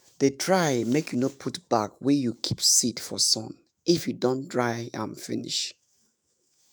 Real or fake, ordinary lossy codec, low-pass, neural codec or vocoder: fake; none; none; autoencoder, 48 kHz, 128 numbers a frame, DAC-VAE, trained on Japanese speech